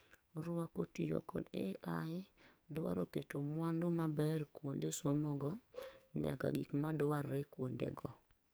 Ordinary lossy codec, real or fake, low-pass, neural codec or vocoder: none; fake; none; codec, 44.1 kHz, 2.6 kbps, SNAC